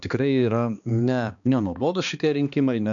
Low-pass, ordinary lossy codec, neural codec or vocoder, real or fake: 7.2 kHz; MP3, 96 kbps; codec, 16 kHz, 2 kbps, X-Codec, HuBERT features, trained on balanced general audio; fake